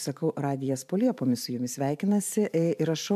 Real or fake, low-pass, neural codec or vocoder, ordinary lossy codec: fake; 14.4 kHz; vocoder, 44.1 kHz, 128 mel bands every 512 samples, BigVGAN v2; MP3, 96 kbps